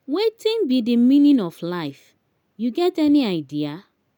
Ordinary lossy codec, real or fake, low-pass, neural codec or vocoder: none; real; 19.8 kHz; none